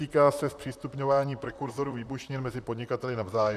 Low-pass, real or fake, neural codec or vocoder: 14.4 kHz; fake; vocoder, 44.1 kHz, 128 mel bands, Pupu-Vocoder